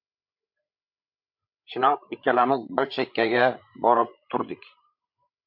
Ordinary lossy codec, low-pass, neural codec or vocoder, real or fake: MP3, 48 kbps; 5.4 kHz; codec, 16 kHz, 8 kbps, FreqCodec, larger model; fake